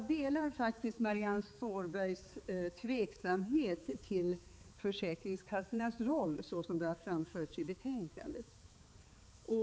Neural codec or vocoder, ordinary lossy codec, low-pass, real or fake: codec, 16 kHz, 4 kbps, X-Codec, HuBERT features, trained on balanced general audio; none; none; fake